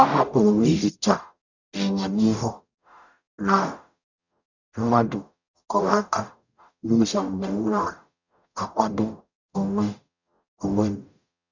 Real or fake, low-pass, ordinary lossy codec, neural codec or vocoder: fake; 7.2 kHz; none; codec, 44.1 kHz, 0.9 kbps, DAC